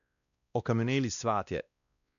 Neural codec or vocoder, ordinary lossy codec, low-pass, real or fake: codec, 16 kHz, 1 kbps, X-Codec, WavLM features, trained on Multilingual LibriSpeech; none; 7.2 kHz; fake